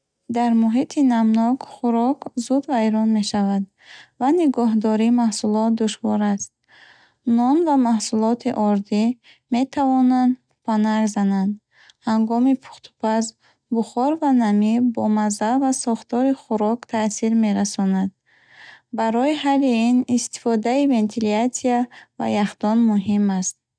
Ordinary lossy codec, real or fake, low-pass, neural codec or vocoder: none; real; 9.9 kHz; none